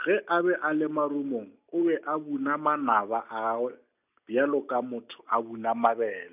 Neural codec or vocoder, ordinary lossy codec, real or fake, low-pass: none; none; real; 3.6 kHz